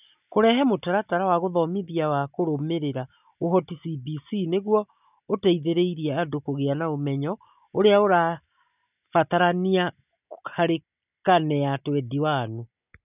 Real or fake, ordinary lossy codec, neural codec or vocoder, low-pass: real; none; none; 3.6 kHz